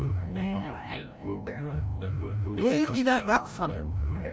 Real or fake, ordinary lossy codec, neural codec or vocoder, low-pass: fake; none; codec, 16 kHz, 0.5 kbps, FreqCodec, larger model; none